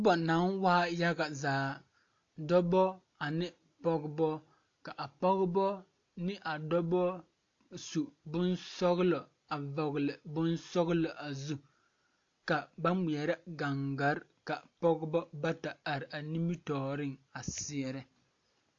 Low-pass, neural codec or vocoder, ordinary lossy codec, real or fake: 7.2 kHz; none; AAC, 48 kbps; real